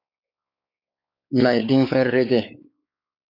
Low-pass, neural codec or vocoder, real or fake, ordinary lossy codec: 5.4 kHz; codec, 16 kHz, 4 kbps, X-Codec, WavLM features, trained on Multilingual LibriSpeech; fake; MP3, 48 kbps